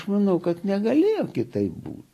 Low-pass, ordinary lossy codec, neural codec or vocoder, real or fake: 14.4 kHz; AAC, 48 kbps; none; real